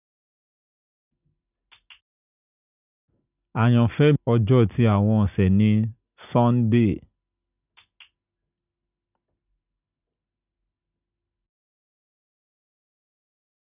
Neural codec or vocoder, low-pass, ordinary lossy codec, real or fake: none; 3.6 kHz; none; real